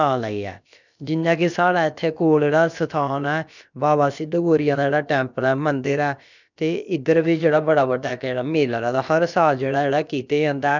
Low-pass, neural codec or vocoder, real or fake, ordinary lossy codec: 7.2 kHz; codec, 16 kHz, about 1 kbps, DyCAST, with the encoder's durations; fake; none